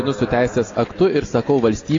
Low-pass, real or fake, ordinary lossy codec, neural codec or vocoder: 7.2 kHz; real; AAC, 32 kbps; none